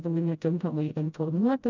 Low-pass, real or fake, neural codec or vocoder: 7.2 kHz; fake; codec, 16 kHz, 0.5 kbps, FreqCodec, smaller model